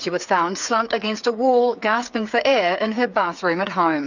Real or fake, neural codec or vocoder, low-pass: fake; codec, 16 kHz, 8 kbps, FreqCodec, smaller model; 7.2 kHz